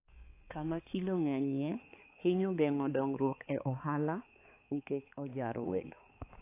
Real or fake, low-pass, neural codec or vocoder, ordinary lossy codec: fake; 3.6 kHz; codec, 16 kHz, 4 kbps, X-Codec, HuBERT features, trained on balanced general audio; AAC, 24 kbps